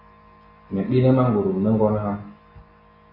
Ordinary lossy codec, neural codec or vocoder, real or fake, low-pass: AAC, 24 kbps; none; real; 5.4 kHz